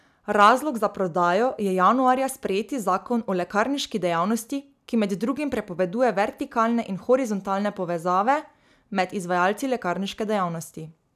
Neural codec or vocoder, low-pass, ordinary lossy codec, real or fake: none; 14.4 kHz; none; real